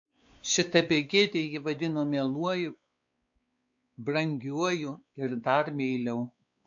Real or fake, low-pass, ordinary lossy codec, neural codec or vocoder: fake; 7.2 kHz; AAC, 64 kbps; codec, 16 kHz, 4 kbps, X-Codec, WavLM features, trained on Multilingual LibriSpeech